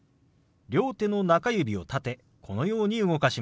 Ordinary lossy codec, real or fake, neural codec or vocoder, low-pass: none; real; none; none